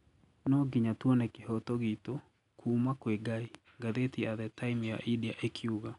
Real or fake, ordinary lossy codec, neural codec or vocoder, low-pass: real; none; none; 10.8 kHz